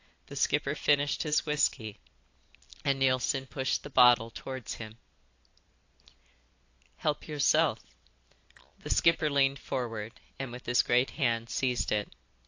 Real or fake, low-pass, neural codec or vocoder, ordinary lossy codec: real; 7.2 kHz; none; AAC, 48 kbps